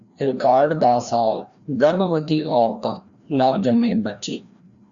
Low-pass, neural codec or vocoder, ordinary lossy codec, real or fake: 7.2 kHz; codec, 16 kHz, 1 kbps, FreqCodec, larger model; Opus, 64 kbps; fake